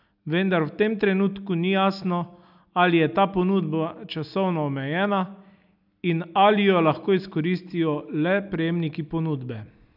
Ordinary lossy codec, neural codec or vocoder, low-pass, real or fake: none; none; 5.4 kHz; real